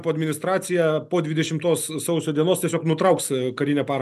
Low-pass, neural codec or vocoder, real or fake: 14.4 kHz; none; real